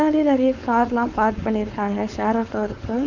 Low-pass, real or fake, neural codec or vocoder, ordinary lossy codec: 7.2 kHz; fake; codec, 16 kHz, 4.8 kbps, FACodec; none